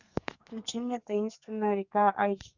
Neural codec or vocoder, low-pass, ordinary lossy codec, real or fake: codec, 32 kHz, 1.9 kbps, SNAC; 7.2 kHz; Opus, 64 kbps; fake